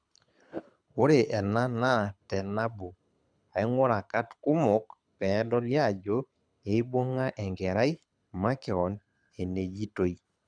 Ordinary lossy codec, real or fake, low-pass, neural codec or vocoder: none; fake; 9.9 kHz; codec, 24 kHz, 6 kbps, HILCodec